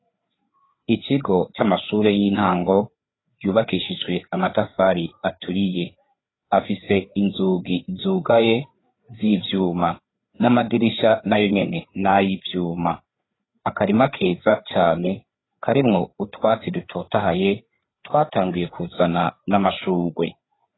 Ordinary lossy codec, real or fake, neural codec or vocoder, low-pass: AAC, 16 kbps; fake; codec, 16 kHz, 4 kbps, FreqCodec, larger model; 7.2 kHz